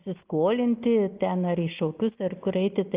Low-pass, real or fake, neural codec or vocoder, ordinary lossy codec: 3.6 kHz; real; none; Opus, 32 kbps